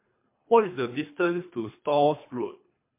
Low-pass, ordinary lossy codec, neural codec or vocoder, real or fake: 3.6 kHz; MP3, 24 kbps; codec, 24 kHz, 3 kbps, HILCodec; fake